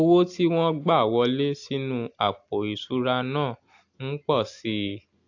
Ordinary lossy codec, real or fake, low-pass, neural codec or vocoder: AAC, 48 kbps; real; 7.2 kHz; none